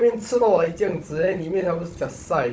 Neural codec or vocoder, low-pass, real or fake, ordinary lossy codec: codec, 16 kHz, 16 kbps, FunCodec, trained on Chinese and English, 50 frames a second; none; fake; none